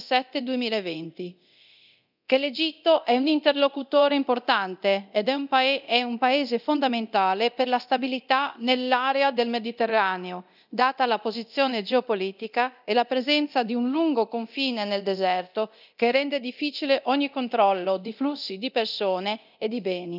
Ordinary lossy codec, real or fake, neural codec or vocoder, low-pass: none; fake; codec, 24 kHz, 0.9 kbps, DualCodec; 5.4 kHz